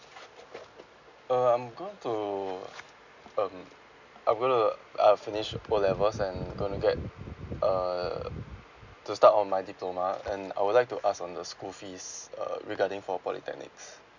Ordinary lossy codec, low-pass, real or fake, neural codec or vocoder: none; 7.2 kHz; real; none